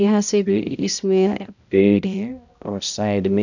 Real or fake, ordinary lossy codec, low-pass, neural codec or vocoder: fake; none; 7.2 kHz; codec, 16 kHz, 0.5 kbps, X-Codec, HuBERT features, trained on balanced general audio